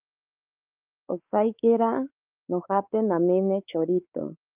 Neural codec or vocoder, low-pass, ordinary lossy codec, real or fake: codec, 16 kHz, 4 kbps, X-Codec, WavLM features, trained on Multilingual LibriSpeech; 3.6 kHz; Opus, 24 kbps; fake